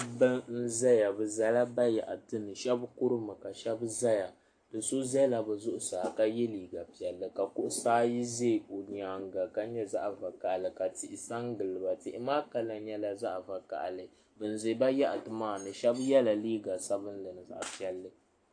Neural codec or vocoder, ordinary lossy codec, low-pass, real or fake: none; AAC, 48 kbps; 9.9 kHz; real